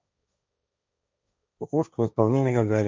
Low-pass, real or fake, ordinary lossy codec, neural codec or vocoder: none; fake; none; codec, 16 kHz, 1.1 kbps, Voila-Tokenizer